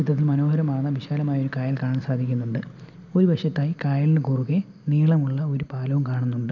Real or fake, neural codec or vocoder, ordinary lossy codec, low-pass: real; none; none; 7.2 kHz